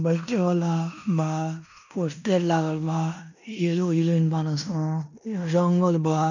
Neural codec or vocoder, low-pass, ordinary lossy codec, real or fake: codec, 16 kHz in and 24 kHz out, 0.9 kbps, LongCat-Audio-Codec, fine tuned four codebook decoder; 7.2 kHz; none; fake